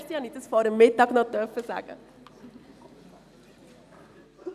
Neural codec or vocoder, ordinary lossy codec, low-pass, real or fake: none; none; 14.4 kHz; real